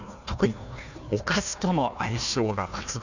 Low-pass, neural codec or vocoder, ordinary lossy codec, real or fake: 7.2 kHz; codec, 16 kHz, 1 kbps, FunCodec, trained on Chinese and English, 50 frames a second; none; fake